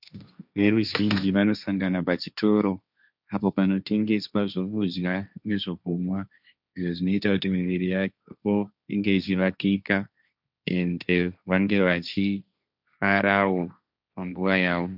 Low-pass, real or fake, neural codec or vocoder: 5.4 kHz; fake; codec, 16 kHz, 1.1 kbps, Voila-Tokenizer